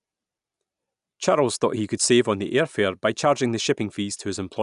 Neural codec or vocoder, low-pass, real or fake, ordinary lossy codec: none; 10.8 kHz; real; none